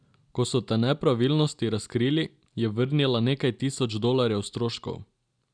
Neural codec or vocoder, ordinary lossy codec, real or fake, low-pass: none; none; real; 9.9 kHz